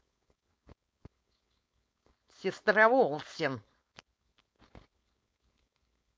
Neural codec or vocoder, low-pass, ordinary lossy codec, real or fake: codec, 16 kHz, 4.8 kbps, FACodec; none; none; fake